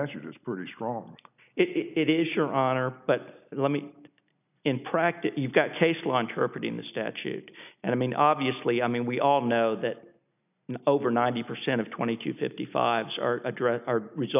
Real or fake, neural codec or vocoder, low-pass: real; none; 3.6 kHz